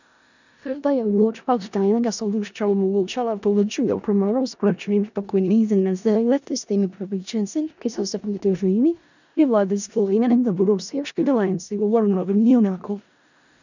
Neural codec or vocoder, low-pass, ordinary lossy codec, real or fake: codec, 16 kHz in and 24 kHz out, 0.4 kbps, LongCat-Audio-Codec, four codebook decoder; 7.2 kHz; none; fake